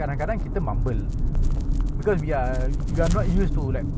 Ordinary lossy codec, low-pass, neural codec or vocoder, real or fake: none; none; none; real